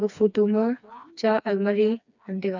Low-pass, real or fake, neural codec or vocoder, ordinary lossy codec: 7.2 kHz; fake; codec, 16 kHz, 2 kbps, FreqCodec, smaller model; none